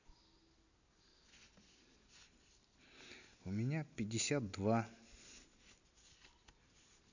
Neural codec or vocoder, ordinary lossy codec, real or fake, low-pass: none; none; real; 7.2 kHz